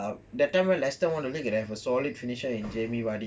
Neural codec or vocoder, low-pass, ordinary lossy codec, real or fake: none; none; none; real